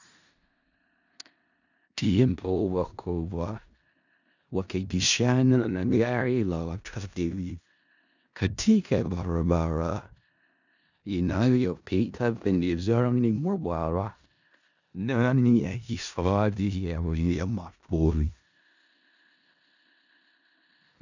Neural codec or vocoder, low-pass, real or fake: codec, 16 kHz in and 24 kHz out, 0.4 kbps, LongCat-Audio-Codec, four codebook decoder; 7.2 kHz; fake